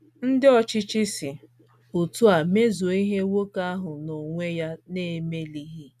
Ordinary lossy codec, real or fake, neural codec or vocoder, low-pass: none; real; none; 14.4 kHz